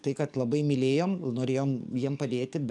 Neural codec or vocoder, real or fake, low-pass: codec, 44.1 kHz, 7.8 kbps, DAC; fake; 10.8 kHz